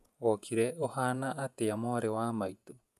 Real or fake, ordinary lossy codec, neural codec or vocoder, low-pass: fake; none; vocoder, 48 kHz, 128 mel bands, Vocos; 14.4 kHz